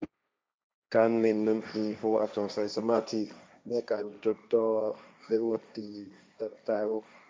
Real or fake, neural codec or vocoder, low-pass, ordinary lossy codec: fake; codec, 16 kHz, 1.1 kbps, Voila-Tokenizer; none; none